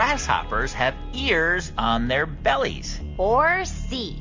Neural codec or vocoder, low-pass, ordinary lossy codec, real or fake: none; 7.2 kHz; MP3, 48 kbps; real